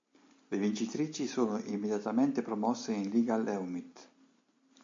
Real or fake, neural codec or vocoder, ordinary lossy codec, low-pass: real; none; MP3, 48 kbps; 7.2 kHz